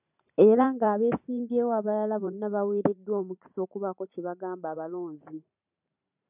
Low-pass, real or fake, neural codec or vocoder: 3.6 kHz; fake; vocoder, 44.1 kHz, 128 mel bands every 512 samples, BigVGAN v2